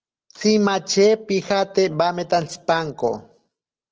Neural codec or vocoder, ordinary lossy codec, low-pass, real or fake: none; Opus, 16 kbps; 7.2 kHz; real